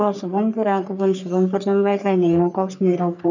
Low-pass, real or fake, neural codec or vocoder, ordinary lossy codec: 7.2 kHz; fake; codec, 44.1 kHz, 3.4 kbps, Pupu-Codec; none